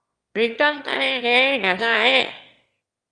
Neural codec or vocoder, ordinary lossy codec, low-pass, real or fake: autoencoder, 22.05 kHz, a latent of 192 numbers a frame, VITS, trained on one speaker; Opus, 32 kbps; 9.9 kHz; fake